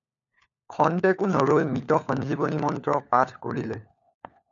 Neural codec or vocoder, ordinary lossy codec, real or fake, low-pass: codec, 16 kHz, 4 kbps, FunCodec, trained on LibriTTS, 50 frames a second; MP3, 96 kbps; fake; 7.2 kHz